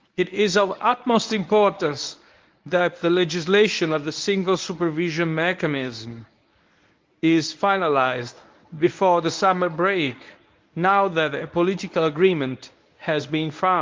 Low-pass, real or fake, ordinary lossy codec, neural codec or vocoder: 7.2 kHz; fake; Opus, 32 kbps; codec, 24 kHz, 0.9 kbps, WavTokenizer, medium speech release version 1